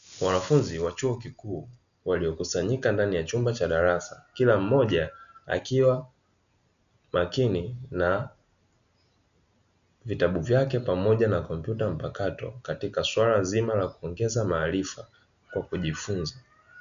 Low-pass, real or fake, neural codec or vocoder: 7.2 kHz; real; none